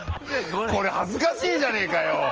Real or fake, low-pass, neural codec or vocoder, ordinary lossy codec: real; 7.2 kHz; none; Opus, 24 kbps